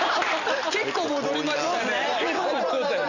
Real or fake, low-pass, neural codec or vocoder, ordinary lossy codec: real; 7.2 kHz; none; none